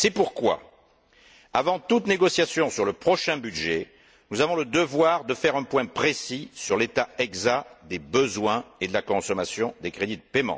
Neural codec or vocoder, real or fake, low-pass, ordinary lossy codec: none; real; none; none